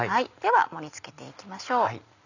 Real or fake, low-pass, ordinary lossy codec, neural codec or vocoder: real; 7.2 kHz; none; none